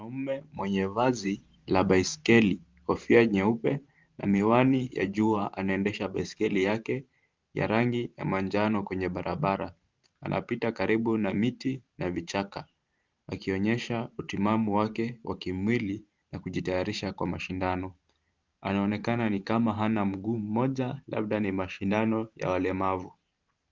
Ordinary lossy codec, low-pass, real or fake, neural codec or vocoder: Opus, 32 kbps; 7.2 kHz; fake; vocoder, 44.1 kHz, 128 mel bands every 512 samples, BigVGAN v2